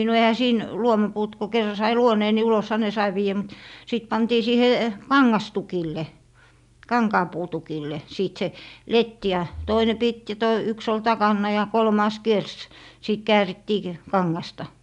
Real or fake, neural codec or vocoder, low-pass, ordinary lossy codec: real; none; 10.8 kHz; none